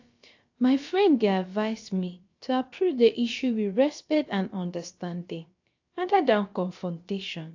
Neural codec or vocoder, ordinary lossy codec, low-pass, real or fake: codec, 16 kHz, about 1 kbps, DyCAST, with the encoder's durations; AAC, 48 kbps; 7.2 kHz; fake